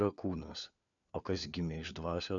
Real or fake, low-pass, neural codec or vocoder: fake; 7.2 kHz; codec, 16 kHz, 4 kbps, FunCodec, trained on LibriTTS, 50 frames a second